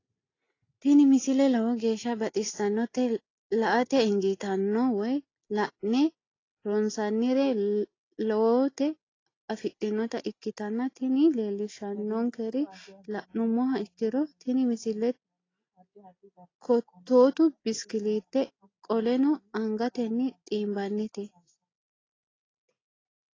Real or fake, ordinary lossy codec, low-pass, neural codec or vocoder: real; AAC, 32 kbps; 7.2 kHz; none